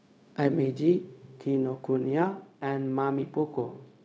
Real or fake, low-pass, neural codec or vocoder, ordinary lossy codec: fake; none; codec, 16 kHz, 0.4 kbps, LongCat-Audio-Codec; none